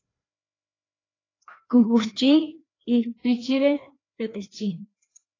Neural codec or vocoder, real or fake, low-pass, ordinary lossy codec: codec, 16 kHz, 1 kbps, FreqCodec, larger model; fake; 7.2 kHz; AAC, 32 kbps